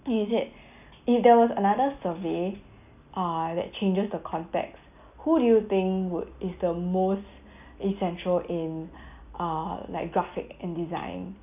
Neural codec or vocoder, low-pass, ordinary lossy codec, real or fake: none; 3.6 kHz; none; real